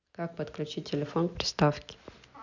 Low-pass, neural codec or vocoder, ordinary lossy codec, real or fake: 7.2 kHz; none; none; real